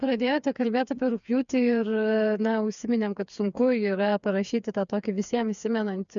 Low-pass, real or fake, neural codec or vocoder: 7.2 kHz; fake; codec, 16 kHz, 4 kbps, FreqCodec, smaller model